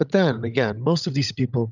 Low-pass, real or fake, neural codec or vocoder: 7.2 kHz; fake; codec, 16 kHz, 16 kbps, FunCodec, trained on LibriTTS, 50 frames a second